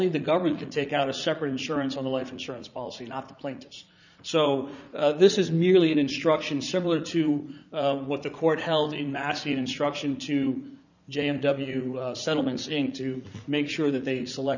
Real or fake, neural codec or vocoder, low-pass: fake; vocoder, 44.1 kHz, 80 mel bands, Vocos; 7.2 kHz